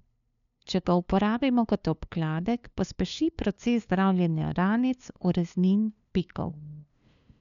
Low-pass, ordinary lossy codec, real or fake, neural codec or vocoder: 7.2 kHz; none; fake; codec, 16 kHz, 2 kbps, FunCodec, trained on LibriTTS, 25 frames a second